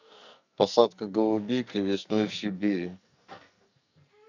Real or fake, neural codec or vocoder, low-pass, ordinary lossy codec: fake; codec, 44.1 kHz, 2.6 kbps, SNAC; 7.2 kHz; none